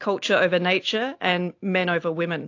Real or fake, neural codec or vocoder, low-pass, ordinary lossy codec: real; none; 7.2 kHz; AAC, 48 kbps